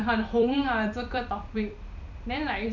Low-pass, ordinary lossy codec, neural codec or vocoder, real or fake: 7.2 kHz; none; vocoder, 44.1 kHz, 128 mel bands every 256 samples, BigVGAN v2; fake